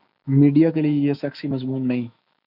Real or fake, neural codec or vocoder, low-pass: fake; autoencoder, 48 kHz, 128 numbers a frame, DAC-VAE, trained on Japanese speech; 5.4 kHz